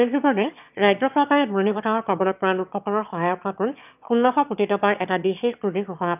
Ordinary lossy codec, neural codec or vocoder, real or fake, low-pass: none; autoencoder, 22.05 kHz, a latent of 192 numbers a frame, VITS, trained on one speaker; fake; 3.6 kHz